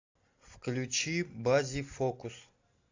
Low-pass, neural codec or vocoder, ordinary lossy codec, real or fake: 7.2 kHz; none; AAC, 48 kbps; real